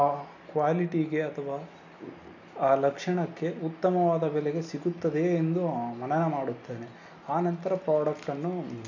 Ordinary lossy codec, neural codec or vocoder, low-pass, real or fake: none; none; 7.2 kHz; real